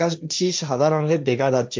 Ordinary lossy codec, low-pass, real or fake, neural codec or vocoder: none; none; fake; codec, 16 kHz, 1.1 kbps, Voila-Tokenizer